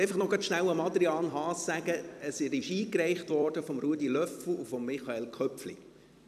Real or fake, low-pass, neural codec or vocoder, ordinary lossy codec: fake; 14.4 kHz; vocoder, 44.1 kHz, 128 mel bands every 256 samples, BigVGAN v2; none